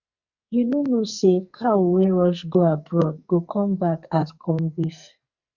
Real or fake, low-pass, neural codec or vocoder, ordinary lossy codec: fake; 7.2 kHz; codec, 44.1 kHz, 2.6 kbps, SNAC; Opus, 64 kbps